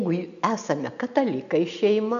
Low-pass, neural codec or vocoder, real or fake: 7.2 kHz; none; real